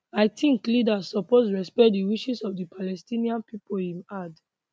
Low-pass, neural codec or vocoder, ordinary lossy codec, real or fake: none; none; none; real